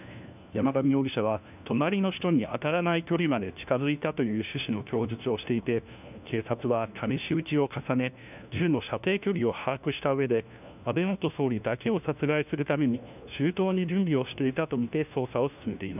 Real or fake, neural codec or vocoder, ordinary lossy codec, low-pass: fake; codec, 16 kHz, 1 kbps, FunCodec, trained on LibriTTS, 50 frames a second; none; 3.6 kHz